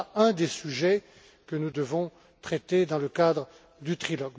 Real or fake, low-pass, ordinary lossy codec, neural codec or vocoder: real; none; none; none